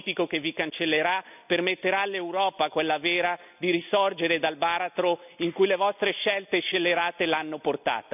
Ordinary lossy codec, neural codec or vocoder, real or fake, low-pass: none; none; real; 3.6 kHz